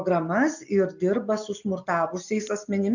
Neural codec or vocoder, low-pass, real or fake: none; 7.2 kHz; real